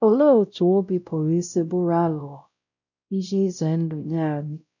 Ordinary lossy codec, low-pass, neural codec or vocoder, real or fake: none; 7.2 kHz; codec, 16 kHz, 0.5 kbps, X-Codec, WavLM features, trained on Multilingual LibriSpeech; fake